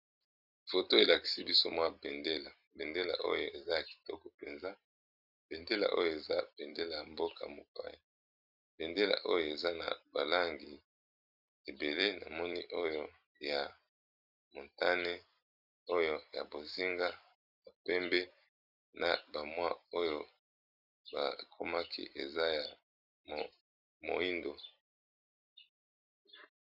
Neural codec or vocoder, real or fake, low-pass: vocoder, 44.1 kHz, 128 mel bands every 256 samples, BigVGAN v2; fake; 5.4 kHz